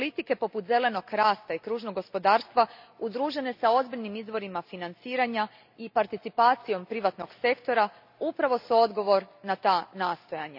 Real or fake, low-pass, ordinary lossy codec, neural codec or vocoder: real; 5.4 kHz; none; none